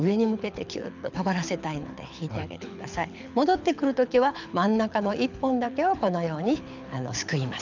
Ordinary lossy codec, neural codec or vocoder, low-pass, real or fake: none; codec, 24 kHz, 6 kbps, HILCodec; 7.2 kHz; fake